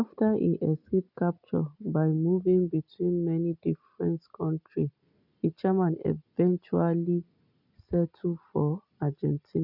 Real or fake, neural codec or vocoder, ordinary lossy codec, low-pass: real; none; none; 5.4 kHz